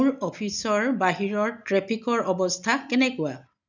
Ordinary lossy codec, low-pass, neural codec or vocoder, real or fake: none; 7.2 kHz; none; real